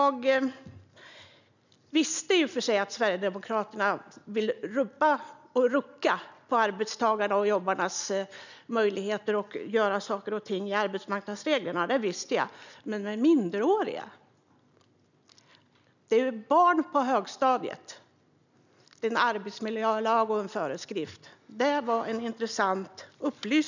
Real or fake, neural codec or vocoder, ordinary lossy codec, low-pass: real; none; none; 7.2 kHz